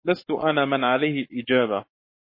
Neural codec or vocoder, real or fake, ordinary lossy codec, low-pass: codec, 44.1 kHz, 7.8 kbps, Pupu-Codec; fake; MP3, 24 kbps; 5.4 kHz